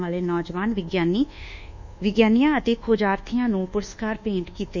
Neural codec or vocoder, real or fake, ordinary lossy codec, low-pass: codec, 24 kHz, 1.2 kbps, DualCodec; fake; none; 7.2 kHz